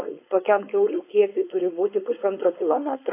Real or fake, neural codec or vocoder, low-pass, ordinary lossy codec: fake; codec, 16 kHz, 4.8 kbps, FACodec; 3.6 kHz; AAC, 24 kbps